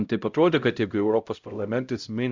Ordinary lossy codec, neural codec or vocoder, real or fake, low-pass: Opus, 64 kbps; codec, 16 kHz, 0.5 kbps, X-Codec, HuBERT features, trained on LibriSpeech; fake; 7.2 kHz